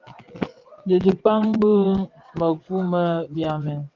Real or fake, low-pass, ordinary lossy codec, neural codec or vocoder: fake; 7.2 kHz; Opus, 24 kbps; vocoder, 22.05 kHz, 80 mel bands, WaveNeXt